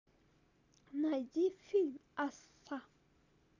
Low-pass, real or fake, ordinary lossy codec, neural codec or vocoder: 7.2 kHz; real; none; none